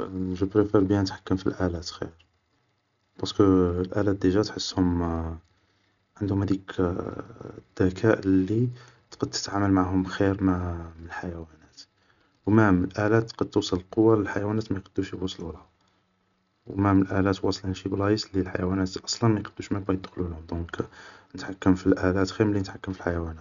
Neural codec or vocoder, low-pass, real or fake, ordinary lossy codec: none; 7.2 kHz; real; none